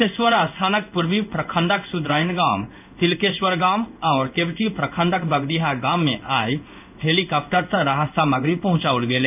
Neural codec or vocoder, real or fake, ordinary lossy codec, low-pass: codec, 16 kHz in and 24 kHz out, 1 kbps, XY-Tokenizer; fake; none; 3.6 kHz